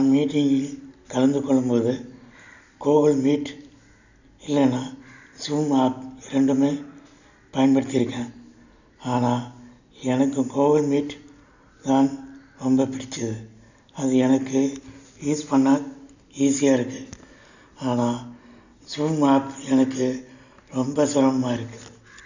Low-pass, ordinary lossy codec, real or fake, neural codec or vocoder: 7.2 kHz; none; real; none